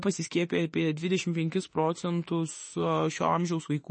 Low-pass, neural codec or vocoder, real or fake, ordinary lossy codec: 10.8 kHz; autoencoder, 48 kHz, 32 numbers a frame, DAC-VAE, trained on Japanese speech; fake; MP3, 32 kbps